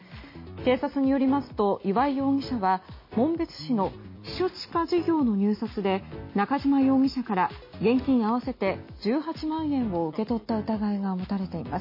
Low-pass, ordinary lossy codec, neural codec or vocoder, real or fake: 5.4 kHz; MP3, 24 kbps; none; real